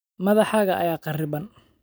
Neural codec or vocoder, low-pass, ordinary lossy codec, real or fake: none; none; none; real